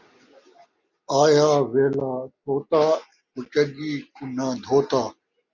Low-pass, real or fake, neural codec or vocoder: 7.2 kHz; real; none